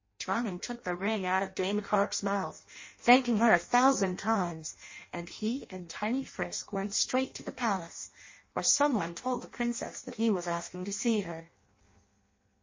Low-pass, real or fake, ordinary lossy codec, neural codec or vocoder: 7.2 kHz; fake; MP3, 32 kbps; codec, 16 kHz in and 24 kHz out, 0.6 kbps, FireRedTTS-2 codec